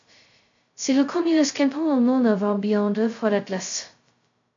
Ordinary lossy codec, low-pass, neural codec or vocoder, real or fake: MP3, 48 kbps; 7.2 kHz; codec, 16 kHz, 0.2 kbps, FocalCodec; fake